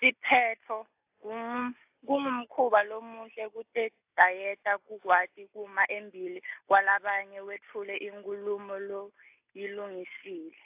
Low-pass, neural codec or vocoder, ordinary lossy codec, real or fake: 3.6 kHz; none; AAC, 32 kbps; real